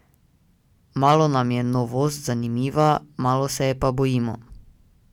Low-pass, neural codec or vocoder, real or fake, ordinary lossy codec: 19.8 kHz; none; real; none